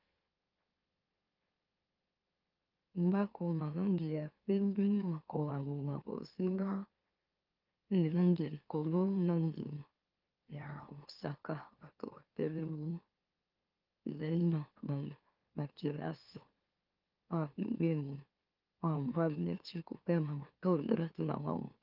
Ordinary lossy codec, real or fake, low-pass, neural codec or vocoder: Opus, 32 kbps; fake; 5.4 kHz; autoencoder, 44.1 kHz, a latent of 192 numbers a frame, MeloTTS